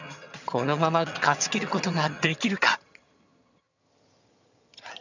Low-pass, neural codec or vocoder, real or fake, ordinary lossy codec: 7.2 kHz; vocoder, 22.05 kHz, 80 mel bands, HiFi-GAN; fake; none